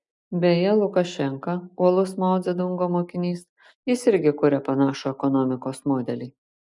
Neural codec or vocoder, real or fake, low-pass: none; real; 10.8 kHz